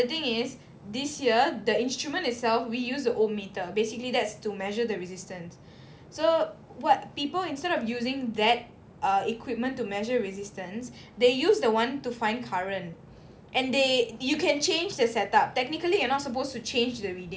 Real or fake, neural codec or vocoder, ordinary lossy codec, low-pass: real; none; none; none